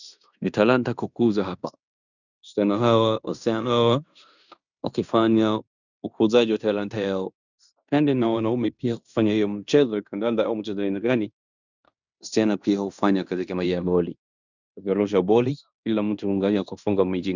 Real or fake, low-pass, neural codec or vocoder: fake; 7.2 kHz; codec, 16 kHz in and 24 kHz out, 0.9 kbps, LongCat-Audio-Codec, fine tuned four codebook decoder